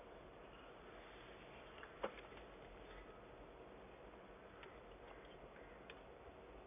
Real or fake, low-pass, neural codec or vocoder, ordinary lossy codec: real; 3.6 kHz; none; none